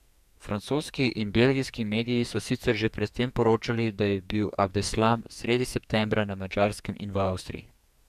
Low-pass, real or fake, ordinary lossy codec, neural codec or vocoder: 14.4 kHz; fake; none; codec, 44.1 kHz, 2.6 kbps, SNAC